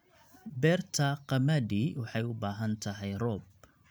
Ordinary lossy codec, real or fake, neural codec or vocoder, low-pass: none; real; none; none